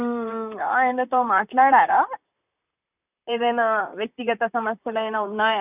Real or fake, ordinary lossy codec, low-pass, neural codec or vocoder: fake; none; 3.6 kHz; vocoder, 44.1 kHz, 128 mel bands, Pupu-Vocoder